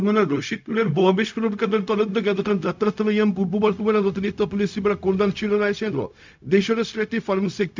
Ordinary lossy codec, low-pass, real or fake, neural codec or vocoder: MP3, 64 kbps; 7.2 kHz; fake; codec, 16 kHz, 0.4 kbps, LongCat-Audio-Codec